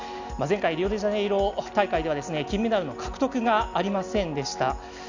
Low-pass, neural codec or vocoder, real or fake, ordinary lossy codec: 7.2 kHz; none; real; none